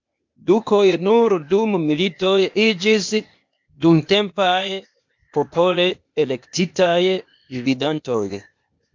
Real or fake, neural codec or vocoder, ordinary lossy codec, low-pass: fake; codec, 16 kHz, 0.8 kbps, ZipCodec; MP3, 64 kbps; 7.2 kHz